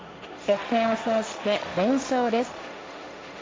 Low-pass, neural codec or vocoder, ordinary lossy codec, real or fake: none; codec, 16 kHz, 1.1 kbps, Voila-Tokenizer; none; fake